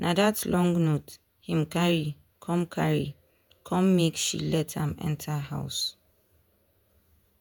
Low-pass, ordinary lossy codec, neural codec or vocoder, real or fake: 19.8 kHz; none; vocoder, 48 kHz, 128 mel bands, Vocos; fake